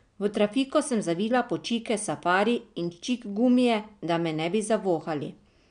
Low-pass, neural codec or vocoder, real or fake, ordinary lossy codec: 9.9 kHz; none; real; none